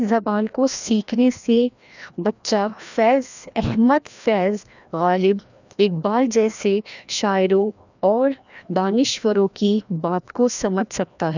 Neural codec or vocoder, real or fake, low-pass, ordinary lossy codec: codec, 16 kHz, 1 kbps, FreqCodec, larger model; fake; 7.2 kHz; none